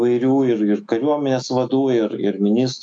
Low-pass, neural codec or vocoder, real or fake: 9.9 kHz; autoencoder, 48 kHz, 128 numbers a frame, DAC-VAE, trained on Japanese speech; fake